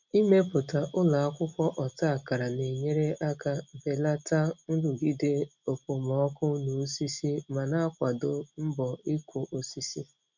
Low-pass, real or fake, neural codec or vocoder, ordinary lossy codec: 7.2 kHz; real; none; none